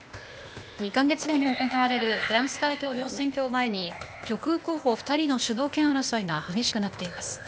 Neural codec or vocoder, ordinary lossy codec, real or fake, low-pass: codec, 16 kHz, 0.8 kbps, ZipCodec; none; fake; none